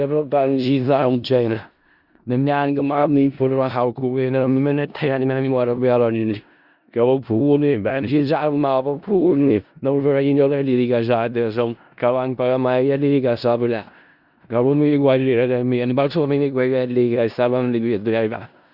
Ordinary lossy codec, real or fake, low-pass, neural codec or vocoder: Opus, 64 kbps; fake; 5.4 kHz; codec, 16 kHz in and 24 kHz out, 0.4 kbps, LongCat-Audio-Codec, four codebook decoder